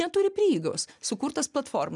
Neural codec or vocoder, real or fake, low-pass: none; real; 10.8 kHz